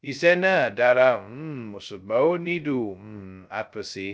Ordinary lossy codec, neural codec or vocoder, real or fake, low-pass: none; codec, 16 kHz, 0.2 kbps, FocalCodec; fake; none